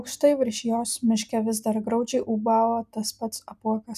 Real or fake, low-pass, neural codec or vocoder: real; 14.4 kHz; none